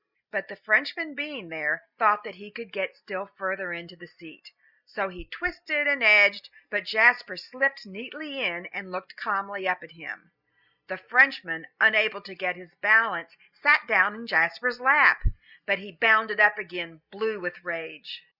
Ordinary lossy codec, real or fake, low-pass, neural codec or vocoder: Opus, 64 kbps; real; 5.4 kHz; none